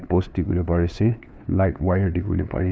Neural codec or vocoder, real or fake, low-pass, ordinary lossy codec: codec, 16 kHz, 2 kbps, FunCodec, trained on LibriTTS, 25 frames a second; fake; none; none